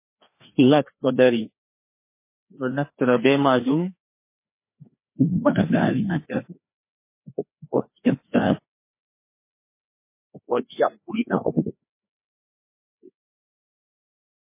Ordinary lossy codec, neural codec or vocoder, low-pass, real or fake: MP3, 24 kbps; codec, 16 kHz, 2 kbps, FreqCodec, larger model; 3.6 kHz; fake